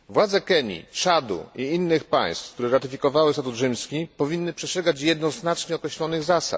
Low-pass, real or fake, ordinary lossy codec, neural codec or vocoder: none; real; none; none